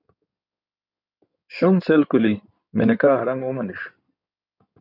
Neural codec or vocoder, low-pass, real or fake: codec, 16 kHz in and 24 kHz out, 2.2 kbps, FireRedTTS-2 codec; 5.4 kHz; fake